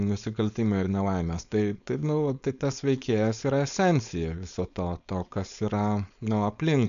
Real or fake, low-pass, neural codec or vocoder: fake; 7.2 kHz; codec, 16 kHz, 4.8 kbps, FACodec